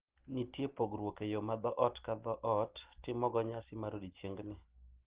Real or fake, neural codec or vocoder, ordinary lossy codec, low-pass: real; none; Opus, 16 kbps; 3.6 kHz